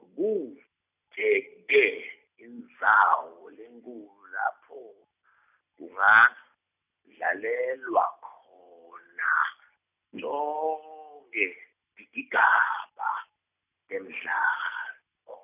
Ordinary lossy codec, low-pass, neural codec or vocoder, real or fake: none; 3.6 kHz; none; real